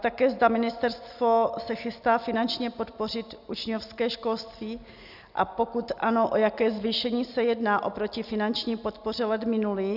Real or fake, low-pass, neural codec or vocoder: real; 5.4 kHz; none